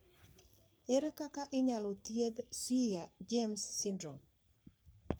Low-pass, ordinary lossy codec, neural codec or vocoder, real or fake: none; none; codec, 44.1 kHz, 3.4 kbps, Pupu-Codec; fake